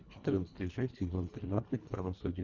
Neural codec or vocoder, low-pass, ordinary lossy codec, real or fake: codec, 24 kHz, 1.5 kbps, HILCodec; 7.2 kHz; Opus, 64 kbps; fake